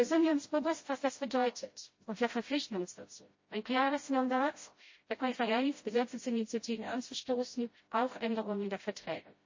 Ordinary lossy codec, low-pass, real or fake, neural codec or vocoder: MP3, 32 kbps; 7.2 kHz; fake; codec, 16 kHz, 0.5 kbps, FreqCodec, smaller model